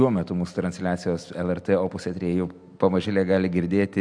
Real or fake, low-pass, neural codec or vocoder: real; 9.9 kHz; none